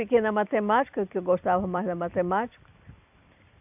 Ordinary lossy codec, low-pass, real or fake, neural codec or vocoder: AAC, 32 kbps; 3.6 kHz; fake; vocoder, 44.1 kHz, 128 mel bands every 256 samples, BigVGAN v2